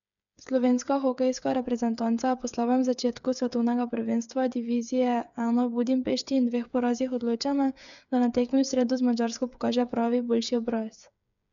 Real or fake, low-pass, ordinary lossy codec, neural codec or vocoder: fake; 7.2 kHz; none; codec, 16 kHz, 8 kbps, FreqCodec, smaller model